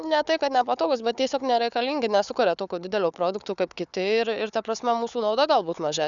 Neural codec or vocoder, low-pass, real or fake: codec, 16 kHz, 4 kbps, FunCodec, trained on Chinese and English, 50 frames a second; 7.2 kHz; fake